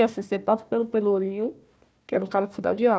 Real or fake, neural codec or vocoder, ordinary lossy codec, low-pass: fake; codec, 16 kHz, 1 kbps, FunCodec, trained on Chinese and English, 50 frames a second; none; none